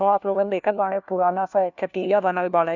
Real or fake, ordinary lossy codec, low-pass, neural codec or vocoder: fake; none; 7.2 kHz; codec, 16 kHz, 1 kbps, FunCodec, trained on LibriTTS, 50 frames a second